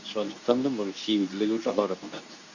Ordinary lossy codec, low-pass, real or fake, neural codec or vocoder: Opus, 64 kbps; 7.2 kHz; fake; codec, 24 kHz, 0.9 kbps, WavTokenizer, medium speech release version 2